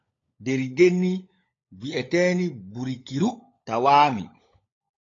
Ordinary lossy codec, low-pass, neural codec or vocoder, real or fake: AAC, 32 kbps; 7.2 kHz; codec, 16 kHz, 16 kbps, FunCodec, trained on LibriTTS, 50 frames a second; fake